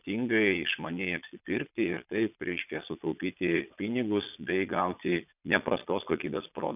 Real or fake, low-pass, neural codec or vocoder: fake; 3.6 kHz; vocoder, 22.05 kHz, 80 mel bands, Vocos